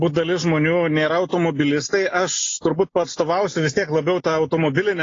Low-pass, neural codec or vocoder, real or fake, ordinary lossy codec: 7.2 kHz; none; real; AAC, 32 kbps